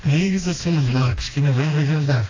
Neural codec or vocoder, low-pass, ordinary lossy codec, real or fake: codec, 16 kHz, 1 kbps, FreqCodec, smaller model; 7.2 kHz; AAC, 32 kbps; fake